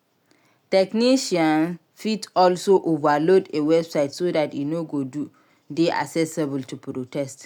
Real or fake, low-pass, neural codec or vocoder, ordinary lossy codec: real; none; none; none